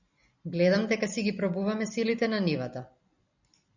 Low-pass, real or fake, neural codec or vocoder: 7.2 kHz; fake; vocoder, 44.1 kHz, 128 mel bands every 256 samples, BigVGAN v2